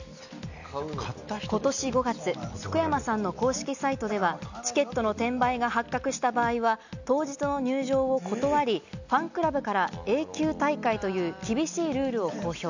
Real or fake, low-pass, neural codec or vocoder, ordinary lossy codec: real; 7.2 kHz; none; none